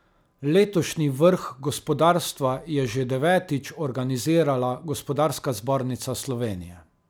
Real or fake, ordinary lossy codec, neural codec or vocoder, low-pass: real; none; none; none